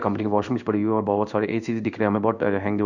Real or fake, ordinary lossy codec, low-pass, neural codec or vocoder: fake; none; 7.2 kHz; codec, 16 kHz in and 24 kHz out, 1 kbps, XY-Tokenizer